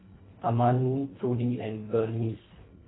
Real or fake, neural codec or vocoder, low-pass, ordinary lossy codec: fake; codec, 24 kHz, 1.5 kbps, HILCodec; 7.2 kHz; AAC, 16 kbps